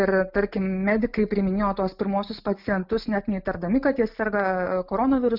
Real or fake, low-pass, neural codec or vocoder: real; 5.4 kHz; none